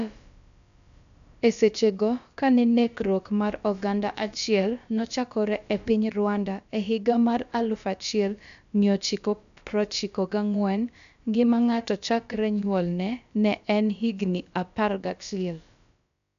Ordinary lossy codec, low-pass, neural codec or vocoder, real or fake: MP3, 96 kbps; 7.2 kHz; codec, 16 kHz, about 1 kbps, DyCAST, with the encoder's durations; fake